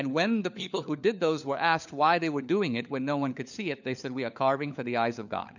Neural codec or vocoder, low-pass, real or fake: codec, 16 kHz, 4 kbps, FunCodec, trained on LibriTTS, 50 frames a second; 7.2 kHz; fake